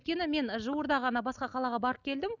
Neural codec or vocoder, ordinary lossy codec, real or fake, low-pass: none; none; real; 7.2 kHz